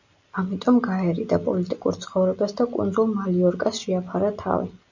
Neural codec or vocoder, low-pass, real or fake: none; 7.2 kHz; real